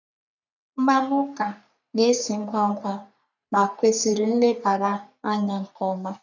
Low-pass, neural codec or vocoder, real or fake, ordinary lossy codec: 7.2 kHz; codec, 44.1 kHz, 3.4 kbps, Pupu-Codec; fake; none